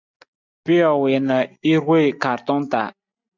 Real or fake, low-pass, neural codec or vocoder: real; 7.2 kHz; none